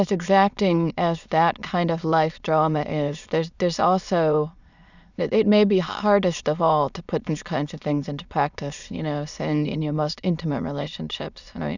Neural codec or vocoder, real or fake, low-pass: autoencoder, 22.05 kHz, a latent of 192 numbers a frame, VITS, trained on many speakers; fake; 7.2 kHz